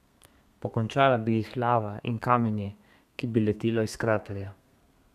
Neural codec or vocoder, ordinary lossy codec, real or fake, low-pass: codec, 32 kHz, 1.9 kbps, SNAC; none; fake; 14.4 kHz